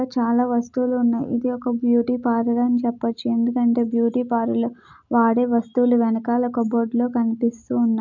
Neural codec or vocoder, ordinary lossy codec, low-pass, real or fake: none; none; 7.2 kHz; real